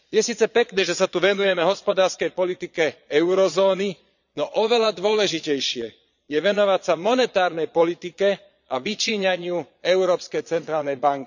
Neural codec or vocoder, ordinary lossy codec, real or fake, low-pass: vocoder, 22.05 kHz, 80 mel bands, Vocos; none; fake; 7.2 kHz